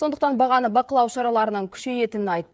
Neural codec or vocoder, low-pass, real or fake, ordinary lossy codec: codec, 16 kHz, 16 kbps, FreqCodec, smaller model; none; fake; none